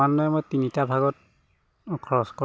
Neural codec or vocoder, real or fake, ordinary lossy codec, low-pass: none; real; none; none